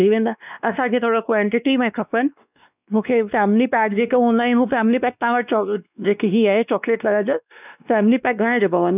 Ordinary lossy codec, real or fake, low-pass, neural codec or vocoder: none; fake; 3.6 kHz; codec, 16 kHz, 2 kbps, X-Codec, WavLM features, trained on Multilingual LibriSpeech